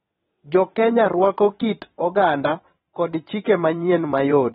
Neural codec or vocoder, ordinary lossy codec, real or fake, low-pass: vocoder, 22.05 kHz, 80 mel bands, WaveNeXt; AAC, 16 kbps; fake; 9.9 kHz